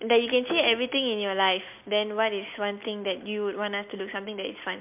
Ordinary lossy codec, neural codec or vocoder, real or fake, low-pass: MP3, 32 kbps; none; real; 3.6 kHz